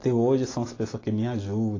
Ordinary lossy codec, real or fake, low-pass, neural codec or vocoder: AAC, 32 kbps; real; 7.2 kHz; none